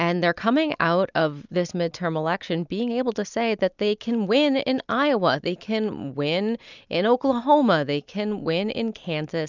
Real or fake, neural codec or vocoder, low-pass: real; none; 7.2 kHz